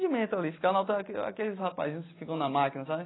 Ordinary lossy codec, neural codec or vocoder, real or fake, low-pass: AAC, 16 kbps; none; real; 7.2 kHz